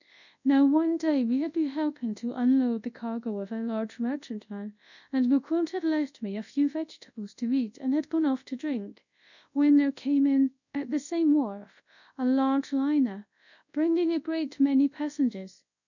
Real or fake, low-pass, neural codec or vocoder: fake; 7.2 kHz; codec, 24 kHz, 0.9 kbps, WavTokenizer, large speech release